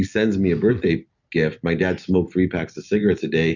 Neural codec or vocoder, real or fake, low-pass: none; real; 7.2 kHz